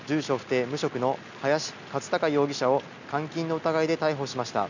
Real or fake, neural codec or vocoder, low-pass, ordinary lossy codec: real; none; 7.2 kHz; none